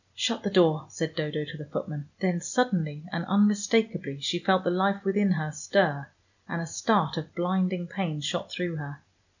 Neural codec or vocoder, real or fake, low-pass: none; real; 7.2 kHz